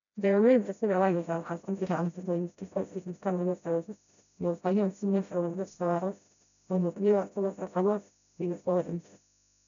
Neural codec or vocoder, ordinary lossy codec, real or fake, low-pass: codec, 16 kHz, 0.5 kbps, FreqCodec, smaller model; none; fake; 7.2 kHz